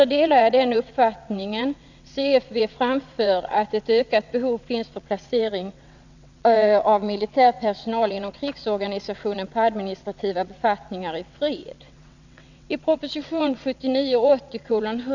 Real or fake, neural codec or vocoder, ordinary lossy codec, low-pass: fake; vocoder, 22.05 kHz, 80 mel bands, WaveNeXt; none; 7.2 kHz